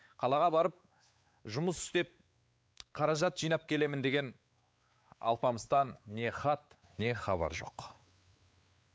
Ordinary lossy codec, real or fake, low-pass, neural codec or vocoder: none; fake; none; codec, 16 kHz, 4 kbps, X-Codec, WavLM features, trained on Multilingual LibriSpeech